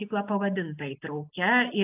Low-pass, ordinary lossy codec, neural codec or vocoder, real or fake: 3.6 kHz; AAC, 32 kbps; none; real